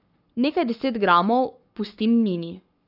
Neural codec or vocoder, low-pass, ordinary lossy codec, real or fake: codec, 44.1 kHz, 7.8 kbps, Pupu-Codec; 5.4 kHz; none; fake